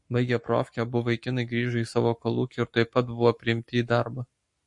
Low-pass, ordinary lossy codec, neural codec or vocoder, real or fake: 10.8 kHz; MP3, 48 kbps; codec, 44.1 kHz, 7.8 kbps, Pupu-Codec; fake